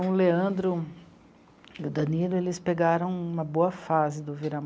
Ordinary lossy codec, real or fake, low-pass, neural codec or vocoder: none; real; none; none